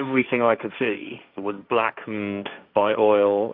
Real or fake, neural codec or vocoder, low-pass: fake; codec, 16 kHz, 1.1 kbps, Voila-Tokenizer; 5.4 kHz